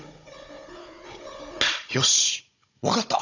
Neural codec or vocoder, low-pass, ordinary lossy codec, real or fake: codec, 16 kHz, 16 kbps, FunCodec, trained on Chinese and English, 50 frames a second; 7.2 kHz; none; fake